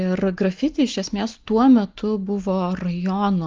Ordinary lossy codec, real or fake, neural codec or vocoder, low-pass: Opus, 24 kbps; real; none; 7.2 kHz